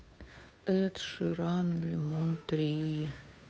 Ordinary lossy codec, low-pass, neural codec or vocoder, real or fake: none; none; codec, 16 kHz, 2 kbps, FunCodec, trained on Chinese and English, 25 frames a second; fake